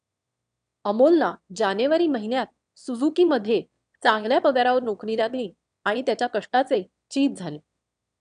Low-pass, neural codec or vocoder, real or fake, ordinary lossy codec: 9.9 kHz; autoencoder, 22.05 kHz, a latent of 192 numbers a frame, VITS, trained on one speaker; fake; none